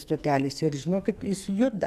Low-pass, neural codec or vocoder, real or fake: 14.4 kHz; codec, 44.1 kHz, 2.6 kbps, SNAC; fake